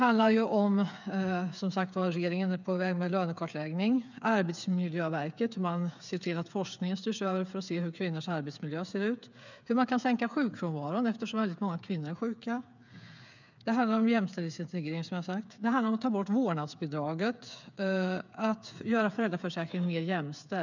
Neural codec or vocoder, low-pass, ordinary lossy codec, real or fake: codec, 16 kHz, 8 kbps, FreqCodec, smaller model; 7.2 kHz; none; fake